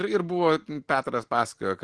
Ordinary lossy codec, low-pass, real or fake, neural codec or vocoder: Opus, 16 kbps; 9.9 kHz; real; none